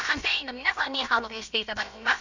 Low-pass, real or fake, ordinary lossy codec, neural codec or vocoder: 7.2 kHz; fake; none; codec, 16 kHz, about 1 kbps, DyCAST, with the encoder's durations